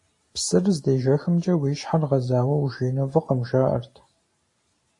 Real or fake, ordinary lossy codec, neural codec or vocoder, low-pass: real; AAC, 48 kbps; none; 10.8 kHz